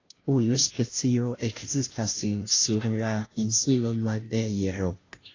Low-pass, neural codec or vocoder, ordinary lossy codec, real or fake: 7.2 kHz; codec, 16 kHz, 0.5 kbps, FunCodec, trained on Chinese and English, 25 frames a second; AAC, 32 kbps; fake